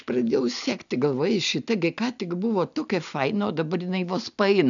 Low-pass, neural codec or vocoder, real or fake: 7.2 kHz; none; real